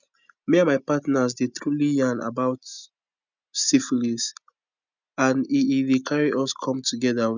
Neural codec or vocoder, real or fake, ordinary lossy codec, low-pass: none; real; none; 7.2 kHz